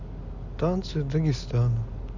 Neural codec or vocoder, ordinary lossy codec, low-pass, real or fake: none; none; 7.2 kHz; real